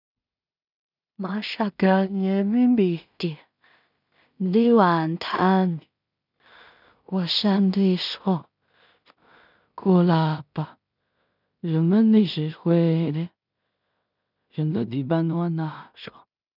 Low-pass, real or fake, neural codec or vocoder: 5.4 kHz; fake; codec, 16 kHz in and 24 kHz out, 0.4 kbps, LongCat-Audio-Codec, two codebook decoder